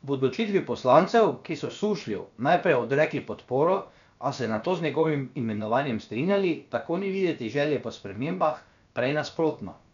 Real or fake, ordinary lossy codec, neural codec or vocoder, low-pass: fake; none; codec, 16 kHz, about 1 kbps, DyCAST, with the encoder's durations; 7.2 kHz